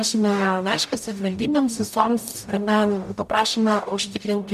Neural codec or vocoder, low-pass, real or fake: codec, 44.1 kHz, 0.9 kbps, DAC; 14.4 kHz; fake